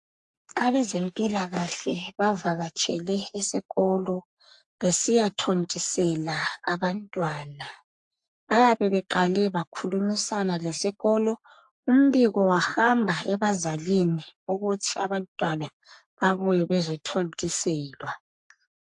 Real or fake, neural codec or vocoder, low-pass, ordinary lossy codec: fake; codec, 44.1 kHz, 3.4 kbps, Pupu-Codec; 10.8 kHz; AAC, 64 kbps